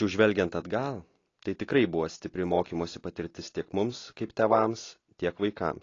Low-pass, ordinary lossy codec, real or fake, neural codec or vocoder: 7.2 kHz; AAC, 32 kbps; real; none